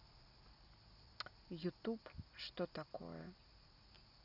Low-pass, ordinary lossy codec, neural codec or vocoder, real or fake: 5.4 kHz; none; none; real